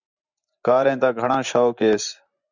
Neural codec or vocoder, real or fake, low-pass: vocoder, 24 kHz, 100 mel bands, Vocos; fake; 7.2 kHz